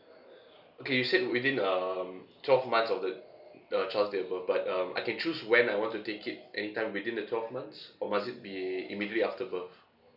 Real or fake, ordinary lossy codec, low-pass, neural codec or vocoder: real; none; 5.4 kHz; none